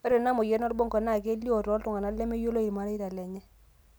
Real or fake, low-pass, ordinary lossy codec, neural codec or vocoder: real; none; none; none